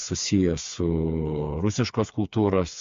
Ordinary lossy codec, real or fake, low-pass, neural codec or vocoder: MP3, 48 kbps; fake; 7.2 kHz; codec, 16 kHz, 4 kbps, FreqCodec, smaller model